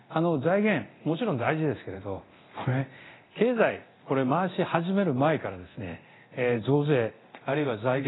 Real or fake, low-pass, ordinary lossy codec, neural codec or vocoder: fake; 7.2 kHz; AAC, 16 kbps; codec, 24 kHz, 0.9 kbps, DualCodec